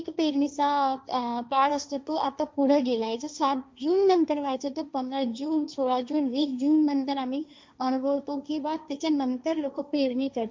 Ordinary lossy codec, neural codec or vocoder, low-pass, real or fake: none; codec, 16 kHz, 1.1 kbps, Voila-Tokenizer; none; fake